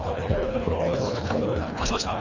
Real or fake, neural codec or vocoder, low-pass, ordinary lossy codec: fake; codec, 24 kHz, 1.5 kbps, HILCodec; 7.2 kHz; Opus, 64 kbps